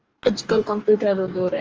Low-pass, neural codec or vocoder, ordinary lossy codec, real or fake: 7.2 kHz; codec, 44.1 kHz, 2.6 kbps, DAC; Opus, 24 kbps; fake